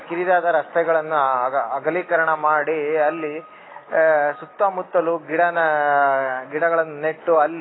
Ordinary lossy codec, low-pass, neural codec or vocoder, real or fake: AAC, 16 kbps; 7.2 kHz; none; real